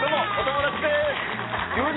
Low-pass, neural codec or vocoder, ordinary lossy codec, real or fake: 7.2 kHz; none; AAC, 16 kbps; real